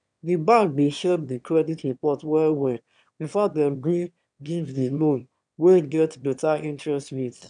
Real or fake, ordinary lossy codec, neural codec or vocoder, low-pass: fake; none; autoencoder, 22.05 kHz, a latent of 192 numbers a frame, VITS, trained on one speaker; 9.9 kHz